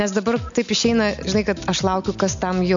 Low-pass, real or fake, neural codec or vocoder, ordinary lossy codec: 7.2 kHz; real; none; MP3, 64 kbps